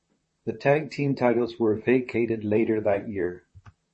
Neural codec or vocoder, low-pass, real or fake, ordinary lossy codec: vocoder, 44.1 kHz, 128 mel bands, Pupu-Vocoder; 10.8 kHz; fake; MP3, 32 kbps